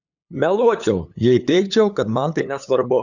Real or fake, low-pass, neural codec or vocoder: fake; 7.2 kHz; codec, 16 kHz, 8 kbps, FunCodec, trained on LibriTTS, 25 frames a second